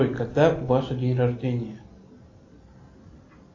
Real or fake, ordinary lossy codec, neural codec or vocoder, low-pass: real; AAC, 48 kbps; none; 7.2 kHz